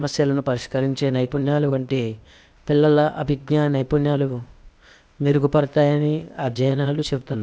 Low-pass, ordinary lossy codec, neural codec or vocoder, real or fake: none; none; codec, 16 kHz, 0.8 kbps, ZipCodec; fake